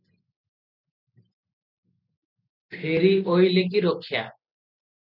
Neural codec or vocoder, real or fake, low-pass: none; real; 5.4 kHz